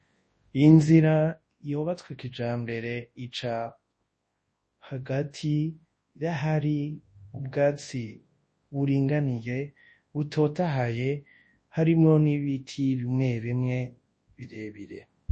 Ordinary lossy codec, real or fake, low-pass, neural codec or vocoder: MP3, 32 kbps; fake; 10.8 kHz; codec, 24 kHz, 0.9 kbps, WavTokenizer, large speech release